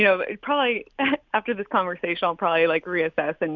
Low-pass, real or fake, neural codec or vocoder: 7.2 kHz; real; none